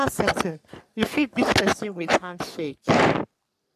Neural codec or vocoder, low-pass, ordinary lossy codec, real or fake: codec, 44.1 kHz, 3.4 kbps, Pupu-Codec; 14.4 kHz; AAC, 96 kbps; fake